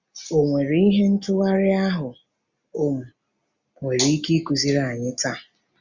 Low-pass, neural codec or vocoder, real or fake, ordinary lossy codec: 7.2 kHz; none; real; Opus, 64 kbps